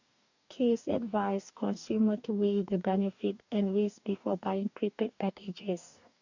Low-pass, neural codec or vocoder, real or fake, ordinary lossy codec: 7.2 kHz; codec, 44.1 kHz, 2.6 kbps, DAC; fake; none